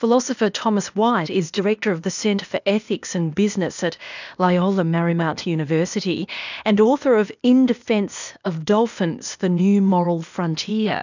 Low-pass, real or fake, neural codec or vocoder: 7.2 kHz; fake; codec, 16 kHz, 0.8 kbps, ZipCodec